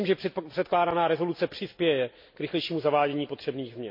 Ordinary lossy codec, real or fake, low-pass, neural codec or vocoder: MP3, 32 kbps; real; 5.4 kHz; none